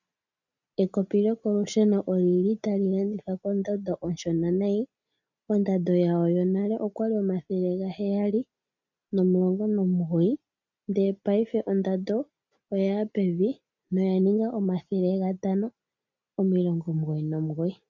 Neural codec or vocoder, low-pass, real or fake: none; 7.2 kHz; real